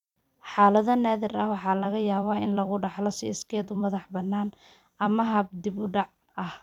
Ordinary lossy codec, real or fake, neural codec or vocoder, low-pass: none; fake; vocoder, 44.1 kHz, 128 mel bands every 256 samples, BigVGAN v2; 19.8 kHz